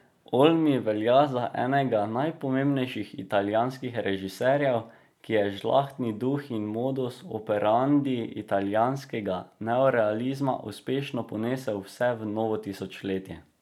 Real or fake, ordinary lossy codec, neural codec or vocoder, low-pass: real; none; none; 19.8 kHz